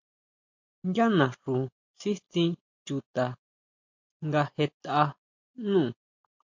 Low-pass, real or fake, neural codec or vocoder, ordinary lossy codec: 7.2 kHz; real; none; MP3, 48 kbps